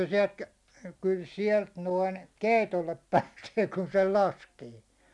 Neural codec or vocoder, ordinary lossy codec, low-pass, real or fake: none; none; none; real